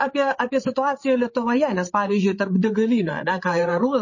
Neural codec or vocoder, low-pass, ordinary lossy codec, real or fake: codec, 16 kHz, 8 kbps, FreqCodec, larger model; 7.2 kHz; MP3, 32 kbps; fake